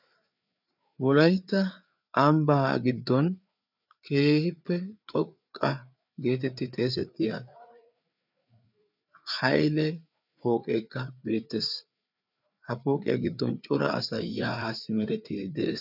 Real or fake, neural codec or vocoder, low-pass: fake; codec, 16 kHz, 4 kbps, FreqCodec, larger model; 5.4 kHz